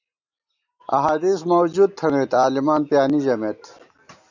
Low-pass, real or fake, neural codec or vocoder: 7.2 kHz; real; none